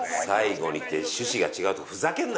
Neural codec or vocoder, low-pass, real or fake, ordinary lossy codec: none; none; real; none